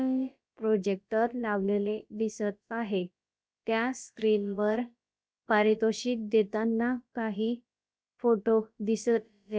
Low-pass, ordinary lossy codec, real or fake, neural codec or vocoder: none; none; fake; codec, 16 kHz, about 1 kbps, DyCAST, with the encoder's durations